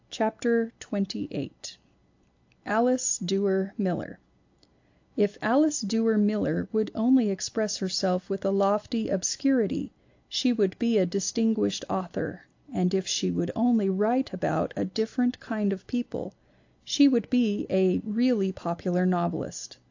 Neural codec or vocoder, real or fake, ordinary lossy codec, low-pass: none; real; AAC, 48 kbps; 7.2 kHz